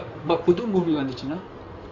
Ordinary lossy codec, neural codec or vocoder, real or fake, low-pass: none; codec, 16 kHz in and 24 kHz out, 2.2 kbps, FireRedTTS-2 codec; fake; 7.2 kHz